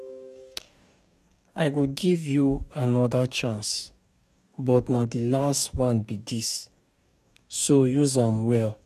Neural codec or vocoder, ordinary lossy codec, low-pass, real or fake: codec, 44.1 kHz, 2.6 kbps, DAC; none; 14.4 kHz; fake